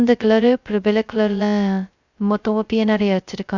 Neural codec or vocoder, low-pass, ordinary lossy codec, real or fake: codec, 16 kHz, 0.2 kbps, FocalCodec; 7.2 kHz; Opus, 64 kbps; fake